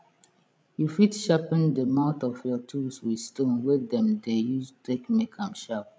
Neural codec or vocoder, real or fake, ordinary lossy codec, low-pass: codec, 16 kHz, 16 kbps, FreqCodec, larger model; fake; none; none